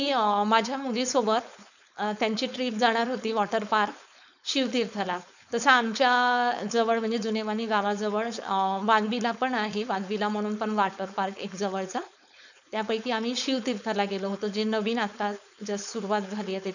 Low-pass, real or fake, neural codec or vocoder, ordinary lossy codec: 7.2 kHz; fake; codec, 16 kHz, 4.8 kbps, FACodec; none